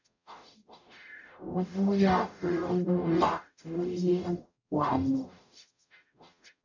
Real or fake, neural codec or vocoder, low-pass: fake; codec, 44.1 kHz, 0.9 kbps, DAC; 7.2 kHz